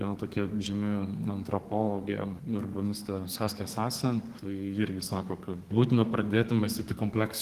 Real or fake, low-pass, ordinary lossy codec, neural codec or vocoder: fake; 14.4 kHz; Opus, 24 kbps; codec, 32 kHz, 1.9 kbps, SNAC